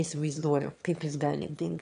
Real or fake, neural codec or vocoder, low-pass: fake; autoencoder, 22.05 kHz, a latent of 192 numbers a frame, VITS, trained on one speaker; 9.9 kHz